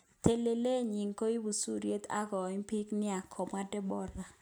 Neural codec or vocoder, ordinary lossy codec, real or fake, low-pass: none; none; real; none